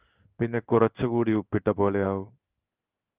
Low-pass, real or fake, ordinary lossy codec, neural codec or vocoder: 3.6 kHz; fake; Opus, 32 kbps; codec, 16 kHz in and 24 kHz out, 1 kbps, XY-Tokenizer